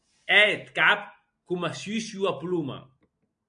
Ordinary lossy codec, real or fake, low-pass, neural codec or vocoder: AAC, 64 kbps; real; 9.9 kHz; none